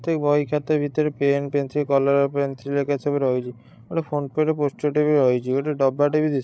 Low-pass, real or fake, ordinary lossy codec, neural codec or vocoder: none; fake; none; codec, 16 kHz, 16 kbps, FreqCodec, larger model